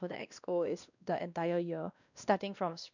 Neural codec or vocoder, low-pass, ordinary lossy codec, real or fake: codec, 16 kHz, 1 kbps, X-Codec, WavLM features, trained on Multilingual LibriSpeech; 7.2 kHz; none; fake